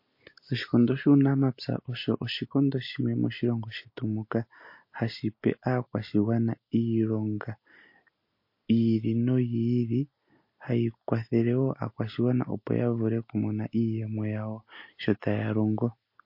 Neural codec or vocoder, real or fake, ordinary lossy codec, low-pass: none; real; MP3, 32 kbps; 5.4 kHz